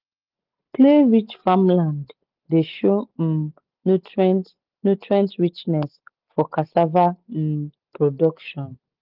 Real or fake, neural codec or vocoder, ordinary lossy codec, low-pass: real; none; Opus, 32 kbps; 5.4 kHz